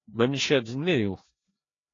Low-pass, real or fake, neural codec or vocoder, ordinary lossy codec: 7.2 kHz; fake; codec, 16 kHz, 1 kbps, FreqCodec, larger model; AAC, 32 kbps